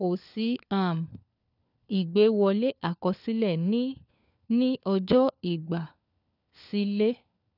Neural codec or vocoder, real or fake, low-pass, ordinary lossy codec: codec, 16 kHz, 4 kbps, FunCodec, trained on LibriTTS, 50 frames a second; fake; 5.4 kHz; none